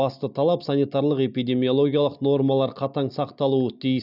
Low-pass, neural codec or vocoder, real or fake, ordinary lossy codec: 5.4 kHz; none; real; none